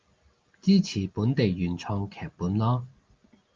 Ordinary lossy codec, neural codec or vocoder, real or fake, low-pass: Opus, 24 kbps; none; real; 7.2 kHz